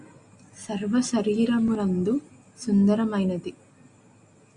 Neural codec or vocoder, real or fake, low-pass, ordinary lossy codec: none; real; 9.9 kHz; Opus, 64 kbps